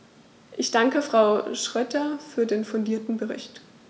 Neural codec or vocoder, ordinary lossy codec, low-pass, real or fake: none; none; none; real